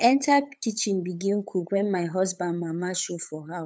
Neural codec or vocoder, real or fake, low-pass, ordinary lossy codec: codec, 16 kHz, 8 kbps, FunCodec, trained on LibriTTS, 25 frames a second; fake; none; none